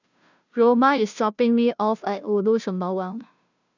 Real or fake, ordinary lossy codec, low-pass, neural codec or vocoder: fake; none; 7.2 kHz; codec, 16 kHz, 0.5 kbps, FunCodec, trained on Chinese and English, 25 frames a second